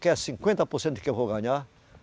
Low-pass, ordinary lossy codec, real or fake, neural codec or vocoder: none; none; real; none